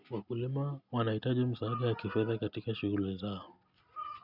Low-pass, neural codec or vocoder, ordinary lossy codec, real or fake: 5.4 kHz; none; none; real